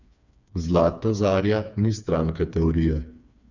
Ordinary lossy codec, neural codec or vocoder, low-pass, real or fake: none; codec, 16 kHz, 4 kbps, FreqCodec, smaller model; 7.2 kHz; fake